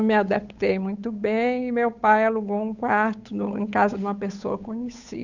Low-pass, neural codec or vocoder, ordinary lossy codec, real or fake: 7.2 kHz; codec, 16 kHz, 8 kbps, FunCodec, trained on Chinese and English, 25 frames a second; none; fake